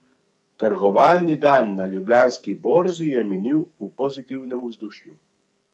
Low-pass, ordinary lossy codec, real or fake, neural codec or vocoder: 10.8 kHz; none; fake; codec, 44.1 kHz, 2.6 kbps, SNAC